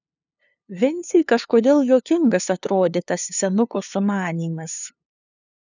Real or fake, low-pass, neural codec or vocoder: fake; 7.2 kHz; codec, 16 kHz, 2 kbps, FunCodec, trained on LibriTTS, 25 frames a second